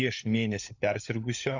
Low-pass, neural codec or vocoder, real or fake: 7.2 kHz; codec, 16 kHz, 16 kbps, FunCodec, trained on LibriTTS, 50 frames a second; fake